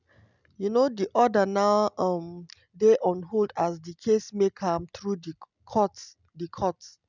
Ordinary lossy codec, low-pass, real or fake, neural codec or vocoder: none; 7.2 kHz; real; none